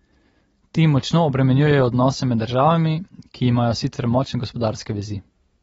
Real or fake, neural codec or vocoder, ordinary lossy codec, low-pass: real; none; AAC, 24 kbps; 19.8 kHz